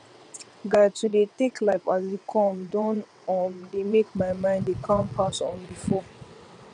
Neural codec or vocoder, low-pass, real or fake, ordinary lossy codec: vocoder, 22.05 kHz, 80 mel bands, WaveNeXt; 9.9 kHz; fake; none